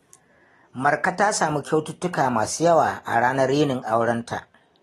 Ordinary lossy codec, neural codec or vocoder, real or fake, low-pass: AAC, 32 kbps; none; real; 19.8 kHz